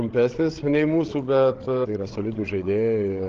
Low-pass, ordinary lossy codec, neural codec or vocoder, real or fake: 7.2 kHz; Opus, 16 kbps; codec, 16 kHz, 16 kbps, FreqCodec, larger model; fake